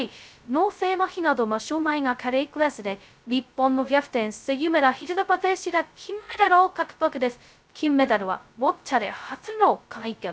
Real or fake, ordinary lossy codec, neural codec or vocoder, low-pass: fake; none; codec, 16 kHz, 0.2 kbps, FocalCodec; none